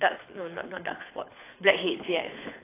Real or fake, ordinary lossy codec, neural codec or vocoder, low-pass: fake; AAC, 16 kbps; codec, 24 kHz, 6 kbps, HILCodec; 3.6 kHz